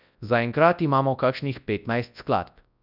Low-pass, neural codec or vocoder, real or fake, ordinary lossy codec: 5.4 kHz; codec, 24 kHz, 0.9 kbps, WavTokenizer, large speech release; fake; none